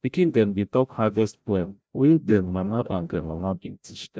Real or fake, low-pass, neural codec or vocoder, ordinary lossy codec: fake; none; codec, 16 kHz, 0.5 kbps, FreqCodec, larger model; none